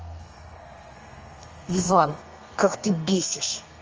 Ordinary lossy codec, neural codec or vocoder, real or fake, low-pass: Opus, 24 kbps; codec, 32 kHz, 1.9 kbps, SNAC; fake; 7.2 kHz